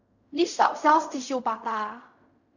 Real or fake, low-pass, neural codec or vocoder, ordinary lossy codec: fake; 7.2 kHz; codec, 16 kHz in and 24 kHz out, 0.4 kbps, LongCat-Audio-Codec, fine tuned four codebook decoder; none